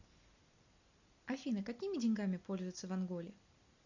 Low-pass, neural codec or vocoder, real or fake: 7.2 kHz; none; real